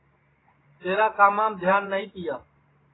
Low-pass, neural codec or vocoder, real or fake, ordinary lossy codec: 7.2 kHz; vocoder, 44.1 kHz, 128 mel bands every 512 samples, BigVGAN v2; fake; AAC, 16 kbps